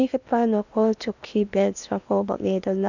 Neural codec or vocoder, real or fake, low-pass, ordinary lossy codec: codec, 16 kHz in and 24 kHz out, 0.8 kbps, FocalCodec, streaming, 65536 codes; fake; 7.2 kHz; none